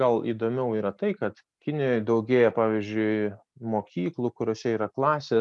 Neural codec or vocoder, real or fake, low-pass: none; real; 10.8 kHz